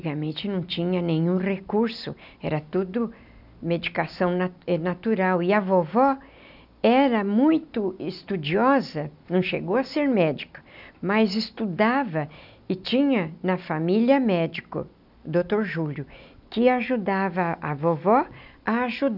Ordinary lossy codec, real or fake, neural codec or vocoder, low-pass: none; real; none; 5.4 kHz